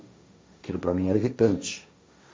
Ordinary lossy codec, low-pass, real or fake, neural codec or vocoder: none; none; fake; codec, 16 kHz, 1.1 kbps, Voila-Tokenizer